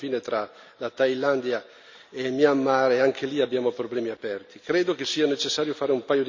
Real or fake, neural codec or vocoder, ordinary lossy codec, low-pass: real; none; MP3, 64 kbps; 7.2 kHz